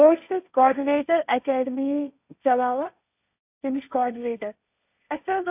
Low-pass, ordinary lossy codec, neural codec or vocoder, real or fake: 3.6 kHz; none; codec, 16 kHz, 1.1 kbps, Voila-Tokenizer; fake